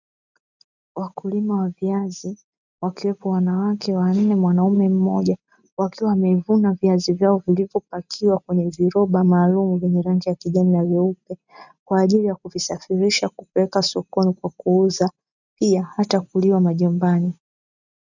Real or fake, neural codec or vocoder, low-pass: real; none; 7.2 kHz